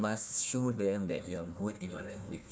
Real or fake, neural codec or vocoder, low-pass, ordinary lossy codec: fake; codec, 16 kHz, 1 kbps, FunCodec, trained on Chinese and English, 50 frames a second; none; none